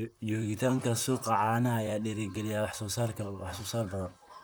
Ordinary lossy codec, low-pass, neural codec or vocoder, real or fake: none; none; vocoder, 44.1 kHz, 128 mel bands, Pupu-Vocoder; fake